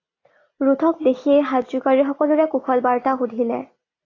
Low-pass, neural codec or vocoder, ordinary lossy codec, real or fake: 7.2 kHz; none; AAC, 32 kbps; real